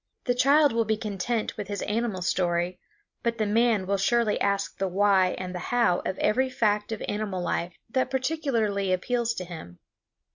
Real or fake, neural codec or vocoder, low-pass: real; none; 7.2 kHz